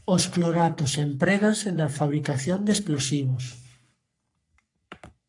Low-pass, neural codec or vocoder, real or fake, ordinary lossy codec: 10.8 kHz; codec, 44.1 kHz, 3.4 kbps, Pupu-Codec; fake; AAC, 64 kbps